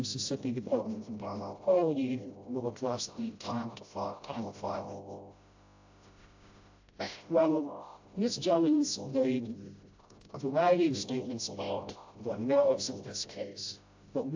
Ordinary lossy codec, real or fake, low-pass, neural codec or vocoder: AAC, 48 kbps; fake; 7.2 kHz; codec, 16 kHz, 0.5 kbps, FreqCodec, smaller model